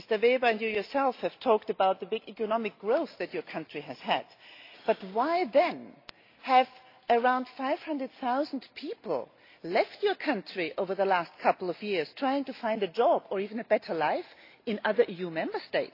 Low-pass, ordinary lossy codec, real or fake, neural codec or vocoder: 5.4 kHz; AAC, 32 kbps; real; none